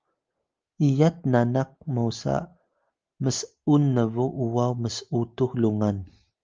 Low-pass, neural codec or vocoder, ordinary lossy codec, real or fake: 7.2 kHz; none; Opus, 24 kbps; real